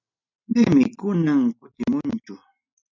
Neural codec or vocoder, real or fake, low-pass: none; real; 7.2 kHz